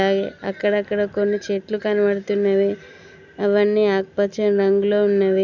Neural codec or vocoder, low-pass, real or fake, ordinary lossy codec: none; 7.2 kHz; real; none